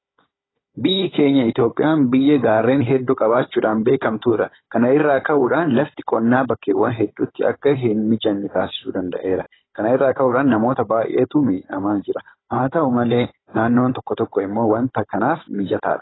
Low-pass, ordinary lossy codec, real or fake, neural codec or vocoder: 7.2 kHz; AAC, 16 kbps; fake; codec, 16 kHz, 16 kbps, FunCodec, trained on Chinese and English, 50 frames a second